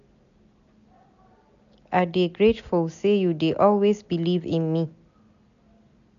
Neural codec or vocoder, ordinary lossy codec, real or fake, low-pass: none; none; real; 7.2 kHz